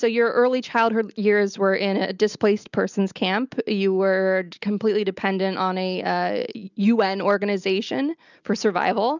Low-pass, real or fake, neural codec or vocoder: 7.2 kHz; real; none